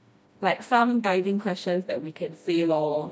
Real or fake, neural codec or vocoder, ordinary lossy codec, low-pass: fake; codec, 16 kHz, 1 kbps, FreqCodec, smaller model; none; none